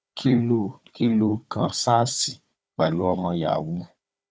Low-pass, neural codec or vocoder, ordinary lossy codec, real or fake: none; codec, 16 kHz, 4 kbps, FunCodec, trained on Chinese and English, 50 frames a second; none; fake